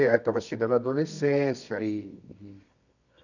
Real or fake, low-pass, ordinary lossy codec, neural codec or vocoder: fake; 7.2 kHz; none; codec, 24 kHz, 0.9 kbps, WavTokenizer, medium music audio release